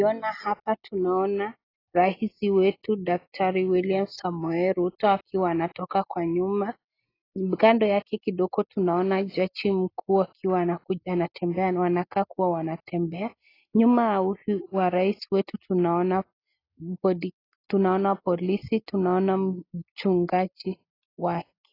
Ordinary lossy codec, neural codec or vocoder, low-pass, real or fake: AAC, 24 kbps; none; 5.4 kHz; real